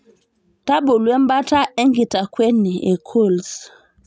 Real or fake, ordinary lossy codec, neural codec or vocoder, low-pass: real; none; none; none